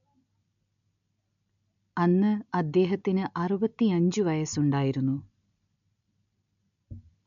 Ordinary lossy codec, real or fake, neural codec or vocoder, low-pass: none; real; none; 7.2 kHz